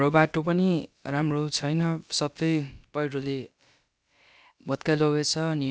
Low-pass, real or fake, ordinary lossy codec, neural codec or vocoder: none; fake; none; codec, 16 kHz, about 1 kbps, DyCAST, with the encoder's durations